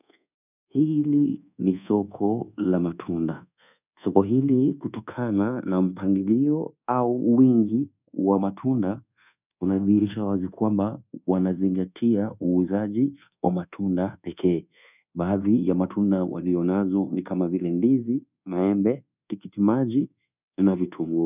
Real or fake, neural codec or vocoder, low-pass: fake; codec, 24 kHz, 1.2 kbps, DualCodec; 3.6 kHz